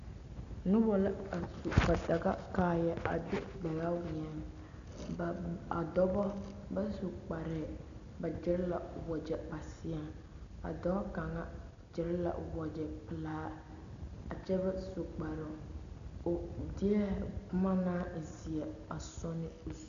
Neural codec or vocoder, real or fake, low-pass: none; real; 7.2 kHz